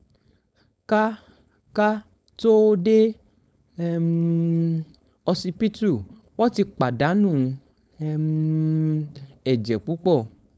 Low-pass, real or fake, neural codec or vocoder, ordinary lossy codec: none; fake; codec, 16 kHz, 4.8 kbps, FACodec; none